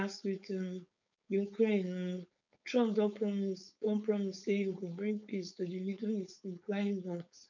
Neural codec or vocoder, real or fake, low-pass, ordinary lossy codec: codec, 16 kHz, 4.8 kbps, FACodec; fake; 7.2 kHz; none